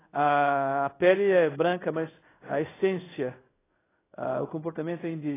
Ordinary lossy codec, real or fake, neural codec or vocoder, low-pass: AAC, 16 kbps; fake; codec, 16 kHz in and 24 kHz out, 1 kbps, XY-Tokenizer; 3.6 kHz